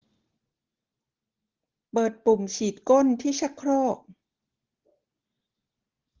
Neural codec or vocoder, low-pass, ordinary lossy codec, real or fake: none; 7.2 kHz; Opus, 16 kbps; real